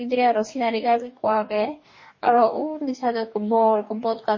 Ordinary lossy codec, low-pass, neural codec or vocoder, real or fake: MP3, 32 kbps; 7.2 kHz; codec, 44.1 kHz, 2.6 kbps, DAC; fake